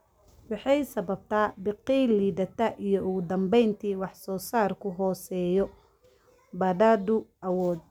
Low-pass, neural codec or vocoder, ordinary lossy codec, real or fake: 19.8 kHz; none; none; real